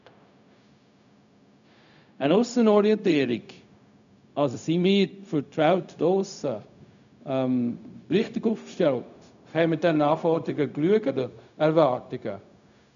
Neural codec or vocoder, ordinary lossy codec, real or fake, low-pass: codec, 16 kHz, 0.4 kbps, LongCat-Audio-Codec; none; fake; 7.2 kHz